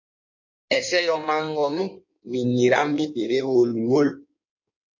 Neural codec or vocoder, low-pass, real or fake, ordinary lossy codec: codec, 16 kHz in and 24 kHz out, 1.1 kbps, FireRedTTS-2 codec; 7.2 kHz; fake; MP3, 48 kbps